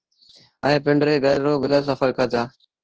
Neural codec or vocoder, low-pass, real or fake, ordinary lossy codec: codec, 24 kHz, 0.9 kbps, WavTokenizer, large speech release; 7.2 kHz; fake; Opus, 16 kbps